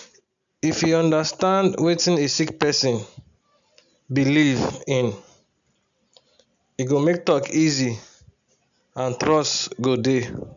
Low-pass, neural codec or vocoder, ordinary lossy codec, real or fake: 7.2 kHz; none; none; real